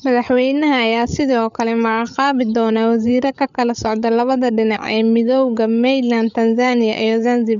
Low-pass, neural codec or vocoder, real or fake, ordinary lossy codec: 7.2 kHz; codec, 16 kHz, 8 kbps, FreqCodec, larger model; fake; none